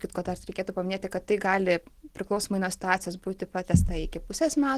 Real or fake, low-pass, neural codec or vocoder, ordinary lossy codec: real; 14.4 kHz; none; Opus, 16 kbps